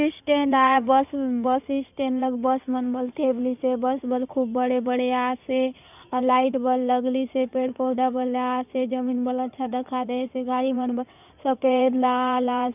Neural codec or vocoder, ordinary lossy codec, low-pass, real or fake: codec, 16 kHz in and 24 kHz out, 2.2 kbps, FireRedTTS-2 codec; none; 3.6 kHz; fake